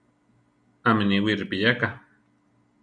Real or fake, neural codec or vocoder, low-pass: real; none; 9.9 kHz